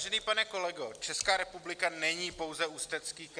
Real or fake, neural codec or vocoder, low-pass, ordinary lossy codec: real; none; 9.9 kHz; Opus, 64 kbps